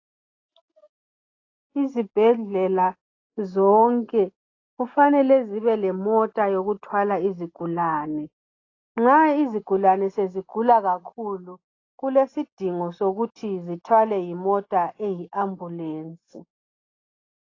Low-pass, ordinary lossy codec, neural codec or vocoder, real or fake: 7.2 kHz; AAC, 32 kbps; none; real